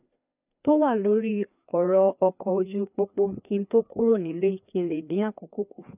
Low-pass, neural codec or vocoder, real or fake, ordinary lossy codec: 3.6 kHz; codec, 16 kHz, 2 kbps, FreqCodec, larger model; fake; none